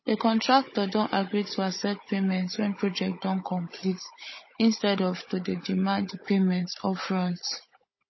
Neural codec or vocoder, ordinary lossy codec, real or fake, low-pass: codec, 16 kHz, 16 kbps, FunCodec, trained on Chinese and English, 50 frames a second; MP3, 24 kbps; fake; 7.2 kHz